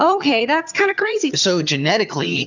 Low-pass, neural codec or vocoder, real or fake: 7.2 kHz; vocoder, 22.05 kHz, 80 mel bands, HiFi-GAN; fake